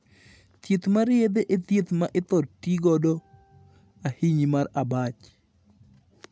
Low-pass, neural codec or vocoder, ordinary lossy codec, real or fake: none; none; none; real